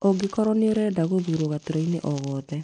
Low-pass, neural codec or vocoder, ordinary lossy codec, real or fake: 9.9 kHz; none; none; real